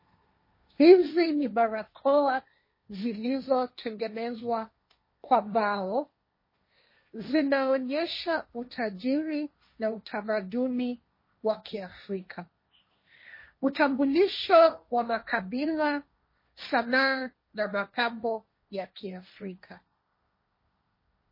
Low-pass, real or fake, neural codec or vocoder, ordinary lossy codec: 5.4 kHz; fake; codec, 16 kHz, 1.1 kbps, Voila-Tokenizer; MP3, 24 kbps